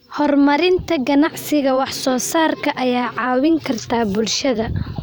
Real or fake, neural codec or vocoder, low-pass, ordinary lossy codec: fake; vocoder, 44.1 kHz, 128 mel bands every 512 samples, BigVGAN v2; none; none